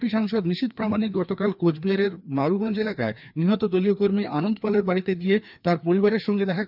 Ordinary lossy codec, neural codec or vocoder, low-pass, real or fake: none; codec, 16 kHz, 2 kbps, FreqCodec, larger model; 5.4 kHz; fake